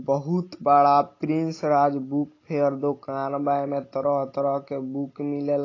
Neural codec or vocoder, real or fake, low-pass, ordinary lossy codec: none; real; 7.2 kHz; AAC, 32 kbps